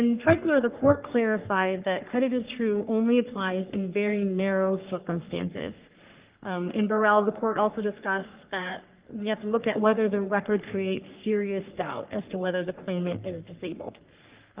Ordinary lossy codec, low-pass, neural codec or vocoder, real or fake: Opus, 24 kbps; 3.6 kHz; codec, 44.1 kHz, 3.4 kbps, Pupu-Codec; fake